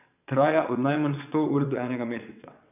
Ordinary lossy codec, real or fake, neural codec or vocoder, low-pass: none; fake; vocoder, 22.05 kHz, 80 mel bands, WaveNeXt; 3.6 kHz